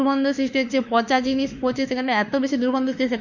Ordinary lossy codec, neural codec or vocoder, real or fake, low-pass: none; autoencoder, 48 kHz, 32 numbers a frame, DAC-VAE, trained on Japanese speech; fake; 7.2 kHz